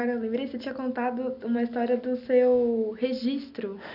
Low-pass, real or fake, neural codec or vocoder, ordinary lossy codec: 5.4 kHz; real; none; none